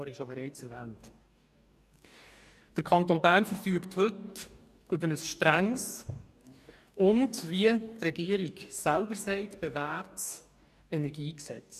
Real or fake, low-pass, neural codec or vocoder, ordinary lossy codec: fake; 14.4 kHz; codec, 44.1 kHz, 2.6 kbps, DAC; none